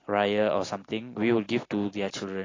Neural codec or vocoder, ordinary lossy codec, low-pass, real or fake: none; AAC, 32 kbps; 7.2 kHz; real